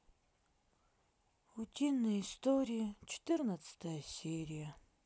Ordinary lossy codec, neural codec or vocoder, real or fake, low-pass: none; none; real; none